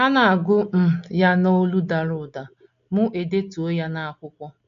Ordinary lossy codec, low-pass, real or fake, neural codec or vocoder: none; 7.2 kHz; real; none